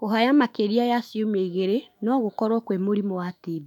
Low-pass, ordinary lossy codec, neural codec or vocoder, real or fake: 19.8 kHz; none; autoencoder, 48 kHz, 128 numbers a frame, DAC-VAE, trained on Japanese speech; fake